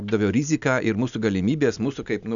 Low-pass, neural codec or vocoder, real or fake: 7.2 kHz; none; real